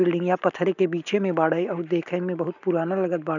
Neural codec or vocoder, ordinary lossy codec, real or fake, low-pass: none; none; real; 7.2 kHz